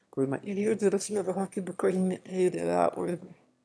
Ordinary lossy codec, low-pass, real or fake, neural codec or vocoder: none; none; fake; autoencoder, 22.05 kHz, a latent of 192 numbers a frame, VITS, trained on one speaker